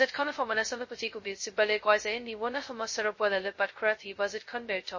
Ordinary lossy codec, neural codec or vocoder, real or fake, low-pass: MP3, 32 kbps; codec, 16 kHz, 0.2 kbps, FocalCodec; fake; 7.2 kHz